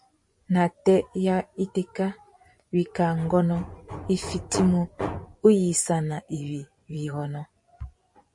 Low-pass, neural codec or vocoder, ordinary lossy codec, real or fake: 10.8 kHz; vocoder, 24 kHz, 100 mel bands, Vocos; MP3, 48 kbps; fake